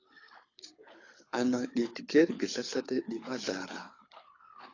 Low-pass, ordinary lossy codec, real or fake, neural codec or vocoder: 7.2 kHz; AAC, 32 kbps; fake; codec, 24 kHz, 6 kbps, HILCodec